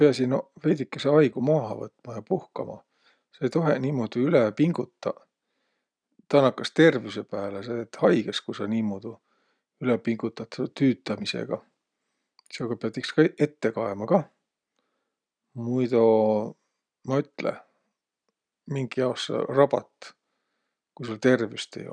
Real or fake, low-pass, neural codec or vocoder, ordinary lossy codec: real; 9.9 kHz; none; none